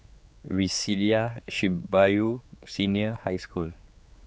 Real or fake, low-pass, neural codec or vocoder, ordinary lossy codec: fake; none; codec, 16 kHz, 4 kbps, X-Codec, HuBERT features, trained on general audio; none